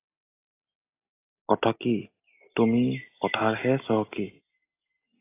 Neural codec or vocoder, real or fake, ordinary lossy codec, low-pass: none; real; AAC, 24 kbps; 3.6 kHz